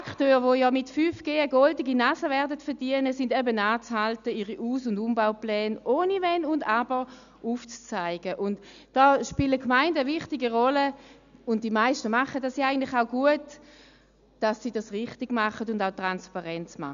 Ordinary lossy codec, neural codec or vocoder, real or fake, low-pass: none; none; real; 7.2 kHz